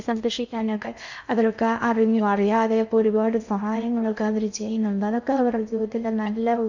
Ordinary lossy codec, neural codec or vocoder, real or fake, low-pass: none; codec, 16 kHz in and 24 kHz out, 0.6 kbps, FocalCodec, streaming, 2048 codes; fake; 7.2 kHz